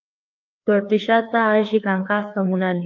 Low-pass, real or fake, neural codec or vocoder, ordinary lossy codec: 7.2 kHz; fake; codec, 16 kHz, 2 kbps, FreqCodec, larger model; AAC, 48 kbps